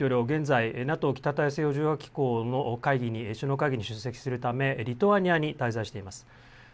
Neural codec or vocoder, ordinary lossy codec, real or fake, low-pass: none; none; real; none